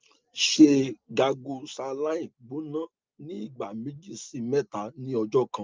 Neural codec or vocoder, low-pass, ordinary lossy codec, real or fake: none; 7.2 kHz; Opus, 24 kbps; real